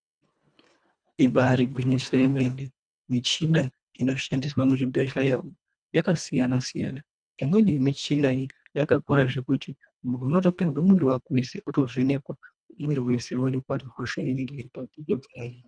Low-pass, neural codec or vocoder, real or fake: 9.9 kHz; codec, 24 kHz, 1.5 kbps, HILCodec; fake